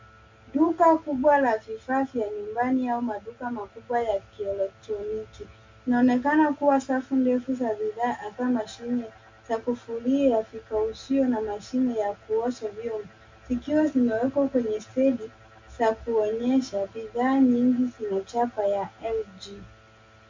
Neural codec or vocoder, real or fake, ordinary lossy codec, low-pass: none; real; MP3, 48 kbps; 7.2 kHz